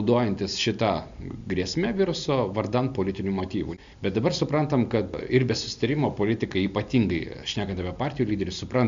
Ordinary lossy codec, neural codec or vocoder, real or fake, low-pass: MP3, 64 kbps; none; real; 7.2 kHz